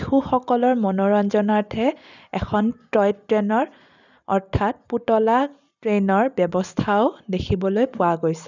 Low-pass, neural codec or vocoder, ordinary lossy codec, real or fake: 7.2 kHz; none; none; real